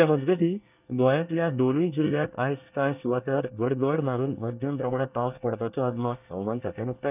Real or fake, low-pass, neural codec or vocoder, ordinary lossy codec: fake; 3.6 kHz; codec, 24 kHz, 1 kbps, SNAC; none